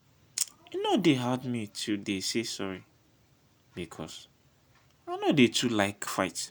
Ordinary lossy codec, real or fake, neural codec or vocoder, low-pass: none; real; none; none